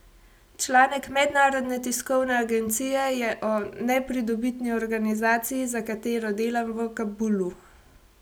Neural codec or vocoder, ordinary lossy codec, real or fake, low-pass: none; none; real; none